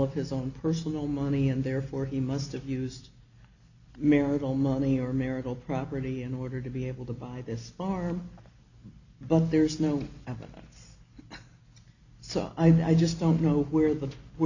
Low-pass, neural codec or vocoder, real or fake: 7.2 kHz; none; real